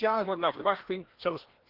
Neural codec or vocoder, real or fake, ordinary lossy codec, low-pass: codec, 16 kHz, 1 kbps, FreqCodec, larger model; fake; Opus, 16 kbps; 5.4 kHz